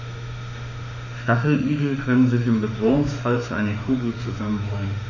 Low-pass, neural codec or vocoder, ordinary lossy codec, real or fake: 7.2 kHz; autoencoder, 48 kHz, 32 numbers a frame, DAC-VAE, trained on Japanese speech; none; fake